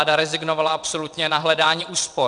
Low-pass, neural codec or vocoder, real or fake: 9.9 kHz; vocoder, 22.05 kHz, 80 mel bands, WaveNeXt; fake